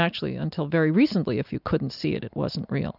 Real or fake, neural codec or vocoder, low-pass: real; none; 5.4 kHz